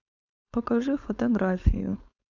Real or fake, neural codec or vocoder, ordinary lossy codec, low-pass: fake; codec, 16 kHz, 4.8 kbps, FACodec; none; 7.2 kHz